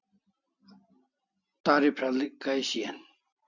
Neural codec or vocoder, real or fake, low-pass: none; real; 7.2 kHz